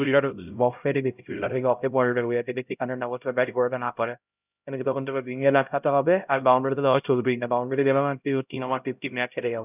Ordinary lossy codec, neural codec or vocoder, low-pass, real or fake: none; codec, 16 kHz, 0.5 kbps, X-Codec, HuBERT features, trained on LibriSpeech; 3.6 kHz; fake